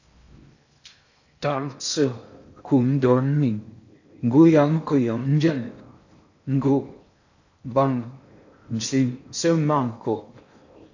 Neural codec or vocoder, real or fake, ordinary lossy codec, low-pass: codec, 16 kHz in and 24 kHz out, 0.6 kbps, FocalCodec, streaming, 4096 codes; fake; AAC, 48 kbps; 7.2 kHz